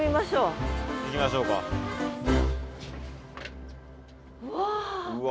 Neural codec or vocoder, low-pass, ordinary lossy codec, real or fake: none; none; none; real